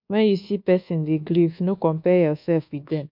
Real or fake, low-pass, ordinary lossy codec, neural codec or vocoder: fake; 5.4 kHz; MP3, 48 kbps; codec, 24 kHz, 1.2 kbps, DualCodec